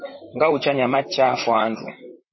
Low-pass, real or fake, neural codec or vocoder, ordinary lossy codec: 7.2 kHz; fake; vocoder, 44.1 kHz, 128 mel bands, Pupu-Vocoder; MP3, 24 kbps